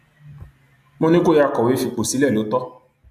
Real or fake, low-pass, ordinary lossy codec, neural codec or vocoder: real; 14.4 kHz; none; none